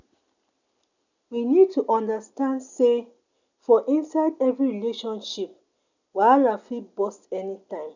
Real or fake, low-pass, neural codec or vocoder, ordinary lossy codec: real; 7.2 kHz; none; none